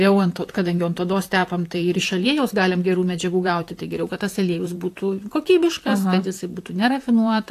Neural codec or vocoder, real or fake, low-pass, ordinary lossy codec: vocoder, 44.1 kHz, 128 mel bands, Pupu-Vocoder; fake; 14.4 kHz; AAC, 64 kbps